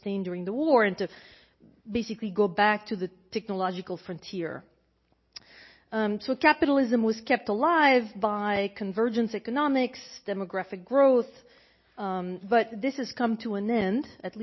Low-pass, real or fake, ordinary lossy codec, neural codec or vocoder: 7.2 kHz; real; MP3, 24 kbps; none